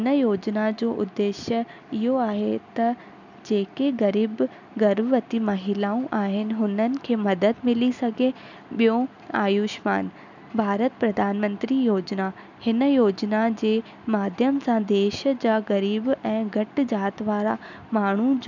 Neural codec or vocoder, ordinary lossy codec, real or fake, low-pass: none; none; real; 7.2 kHz